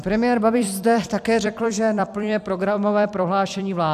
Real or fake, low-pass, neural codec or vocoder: fake; 14.4 kHz; vocoder, 44.1 kHz, 128 mel bands every 256 samples, BigVGAN v2